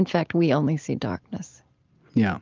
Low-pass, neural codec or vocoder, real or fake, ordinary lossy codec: 7.2 kHz; none; real; Opus, 24 kbps